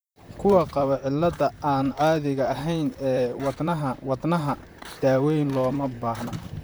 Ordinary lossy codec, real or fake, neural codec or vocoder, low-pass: none; fake; vocoder, 44.1 kHz, 128 mel bands, Pupu-Vocoder; none